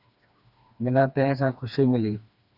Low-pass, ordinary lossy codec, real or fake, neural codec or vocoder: 5.4 kHz; Opus, 64 kbps; fake; codec, 16 kHz, 2 kbps, FreqCodec, smaller model